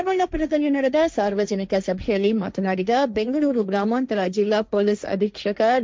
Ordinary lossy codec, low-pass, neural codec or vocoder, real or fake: none; none; codec, 16 kHz, 1.1 kbps, Voila-Tokenizer; fake